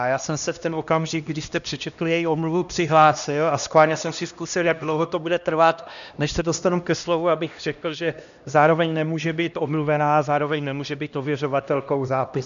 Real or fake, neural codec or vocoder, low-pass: fake; codec, 16 kHz, 1 kbps, X-Codec, HuBERT features, trained on LibriSpeech; 7.2 kHz